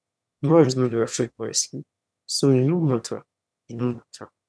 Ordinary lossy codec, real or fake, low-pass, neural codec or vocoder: none; fake; none; autoencoder, 22.05 kHz, a latent of 192 numbers a frame, VITS, trained on one speaker